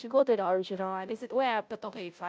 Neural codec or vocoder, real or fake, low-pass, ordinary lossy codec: codec, 16 kHz, 0.5 kbps, FunCodec, trained on Chinese and English, 25 frames a second; fake; none; none